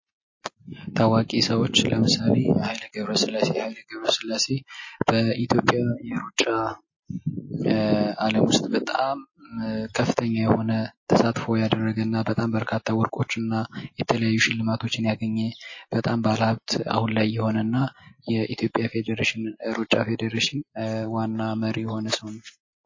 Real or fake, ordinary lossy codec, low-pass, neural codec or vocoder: real; MP3, 32 kbps; 7.2 kHz; none